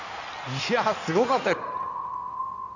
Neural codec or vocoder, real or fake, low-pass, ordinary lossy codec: codec, 16 kHz in and 24 kHz out, 2.2 kbps, FireRedTTS-2 codec; fake; 7.2 kHz; none